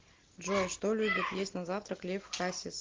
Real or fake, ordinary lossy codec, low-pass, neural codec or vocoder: real; Opus, 16 kbps; 7.2 kHz; none